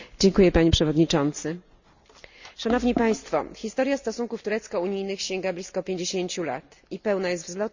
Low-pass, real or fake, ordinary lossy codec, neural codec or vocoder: 7.2 kHz; real; Opus, 64 kbps; none